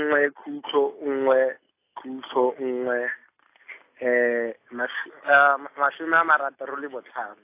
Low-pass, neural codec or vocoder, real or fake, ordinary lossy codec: 3.6 kHz; none; real; AAC, 24 kbps